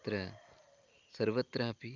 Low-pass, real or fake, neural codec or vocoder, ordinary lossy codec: 7.2 kHz; real; none; Opus, 24 kbps